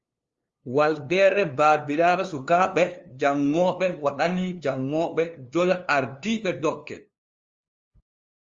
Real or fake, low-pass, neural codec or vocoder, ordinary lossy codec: fake; 7.2 kHz; codec, 16 kHz, 2 kbps, FunCodec, trained on LibriTTS, 25 frames a second; Opus, 32 kbps